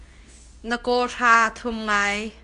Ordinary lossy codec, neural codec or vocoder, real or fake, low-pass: AAC, 96 kbps; codec, 24 kHz, 0.9 kbps, WavTokenizer, medium speech release version 2; fake; 10.8 kHz